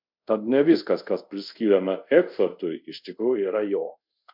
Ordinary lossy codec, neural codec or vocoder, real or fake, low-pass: MP3, 48 kbps; codec, 24 kHz, 0.5 kbps, DualCodec; fake; 5.4 kHz